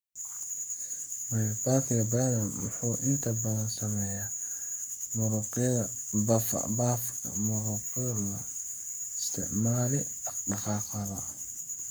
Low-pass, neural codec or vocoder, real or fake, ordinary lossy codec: none; codec, 44.1 kHz, 7.8 kbps, Pupu-Codec; fake; none